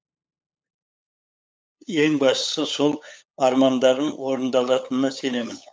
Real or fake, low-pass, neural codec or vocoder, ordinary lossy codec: fake; none; codec, 16 kHz, 8 kbps, FunCodec, trained on LibriTTS, 25 frames a second; none